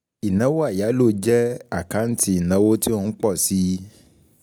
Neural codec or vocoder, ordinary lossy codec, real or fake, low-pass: none; none; real; 19.8 kHz